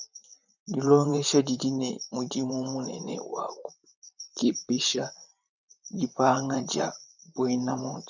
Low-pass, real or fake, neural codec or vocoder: 7.2 kHz; fake; vocoder, 44.1 kHz, 128 mel bands, Pupu-Vocoder